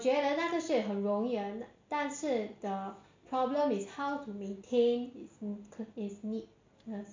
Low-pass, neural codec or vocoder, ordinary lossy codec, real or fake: 7.2 kHz; none; MP3, 48 kbps; real